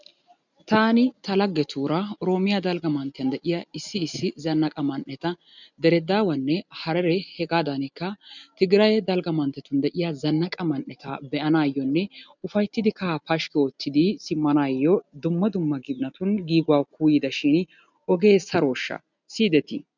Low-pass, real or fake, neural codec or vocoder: 7.2 kHz; real; none